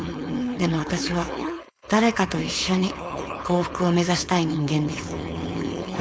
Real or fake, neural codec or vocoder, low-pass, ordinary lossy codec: fake; codec, 16 kHz, 4.8 kbps, FACodec; none; none